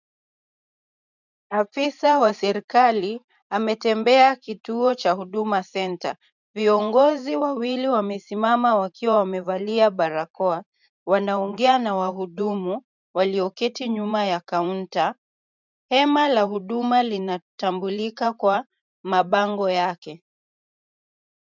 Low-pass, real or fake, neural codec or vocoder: 7.2 kHz; fake; vocoder, 44.1 kHz, 128 mel bands every 512 samples, BigVGAN v2